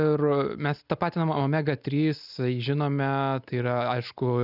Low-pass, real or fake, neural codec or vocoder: 5.4 kHz; real; none